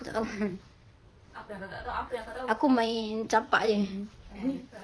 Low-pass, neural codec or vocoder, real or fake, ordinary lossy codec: none; vocoder, 22.05 kHz, 80 mel bands, WaveNeXt; fake; none